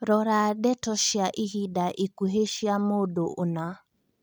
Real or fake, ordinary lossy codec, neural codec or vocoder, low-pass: real; none; none; none